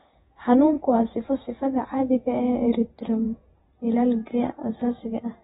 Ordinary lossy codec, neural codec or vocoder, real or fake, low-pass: AAC, 16 kbps; vocoder, 48 kHz, 128 mel bands, Vocos; fake; 19.8 kHz